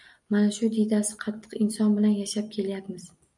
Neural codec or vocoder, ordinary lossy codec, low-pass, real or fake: none; MP3, 96 kbps; 10.8 kHz; real